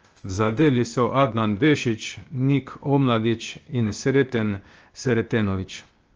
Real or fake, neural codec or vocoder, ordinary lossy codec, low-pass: fake; codec, 16 kHz, 0.8 kbps, ZipCodec; Opus, 24 kbps; 7.2 kHz